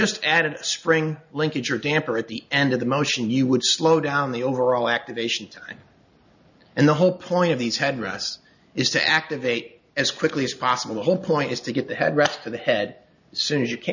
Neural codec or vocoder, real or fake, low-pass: none; real; 7.2 kHz